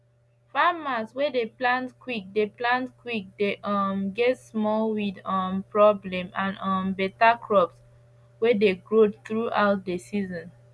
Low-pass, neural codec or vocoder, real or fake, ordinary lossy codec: none; none; real; none